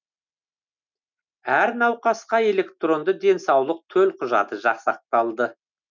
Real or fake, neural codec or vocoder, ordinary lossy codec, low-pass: real; none; none; 7.2 kHz